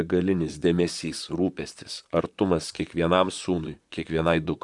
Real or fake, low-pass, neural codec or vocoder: fake; 10.8 kHz; vocoder, 44.1 kHz, 128 mel bands, Pupu-Vocoder